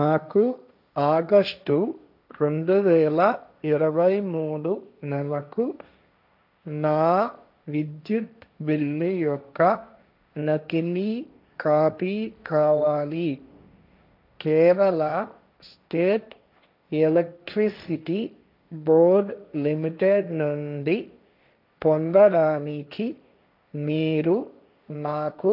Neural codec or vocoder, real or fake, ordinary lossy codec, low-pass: codec, 16 kHz, 1.1 kbps, Voila-Tokenizer; fake; none; 5.4 kHz